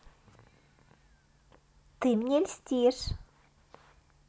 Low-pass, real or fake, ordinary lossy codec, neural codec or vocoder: none; real; none; none